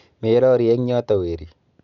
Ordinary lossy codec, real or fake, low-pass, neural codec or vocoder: none; real; 7.2 kHz; none